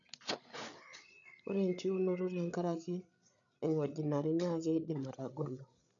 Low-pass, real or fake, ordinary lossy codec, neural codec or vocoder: 7.2 kHz; fake; none; codec, 16 kHz, 8 kbps, FreqCodec, larger model